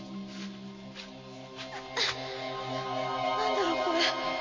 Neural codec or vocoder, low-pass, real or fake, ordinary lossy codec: none; 7.2 kHz; real; MP3, 32 kbps